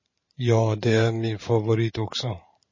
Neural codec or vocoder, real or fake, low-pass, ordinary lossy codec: vocoder, 44.1 kHz, 128 mel bands every 512 samples, BigVGAN v2; fake; 7.2 kHz; MP3, 32 kbps